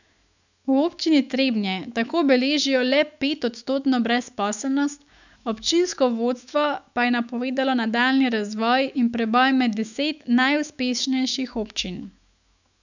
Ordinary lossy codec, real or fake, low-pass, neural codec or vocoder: none; fake; 7.2 kHz; autoencoder, 48 kHz, 128 numbers a frame, DAC-VAE, trained on Japanese speech